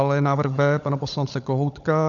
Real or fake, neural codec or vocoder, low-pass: fake; codec, 16 kHz, 16 kbps, FunCodec, trained on LibriTTS, 50 frames a second; 7.2 kHz